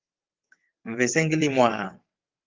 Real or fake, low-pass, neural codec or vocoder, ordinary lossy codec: fake; 7.2 kHz; vocoder, 22.05 kHz, 80 mel bands, WaveNeXt; Opus, 24 kbps